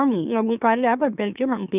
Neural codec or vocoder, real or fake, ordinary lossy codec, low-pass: autoencoder, 44.1 kHz, a latent of 192 numbers a frame, MeloTTS; fake; none; 3.6 kHz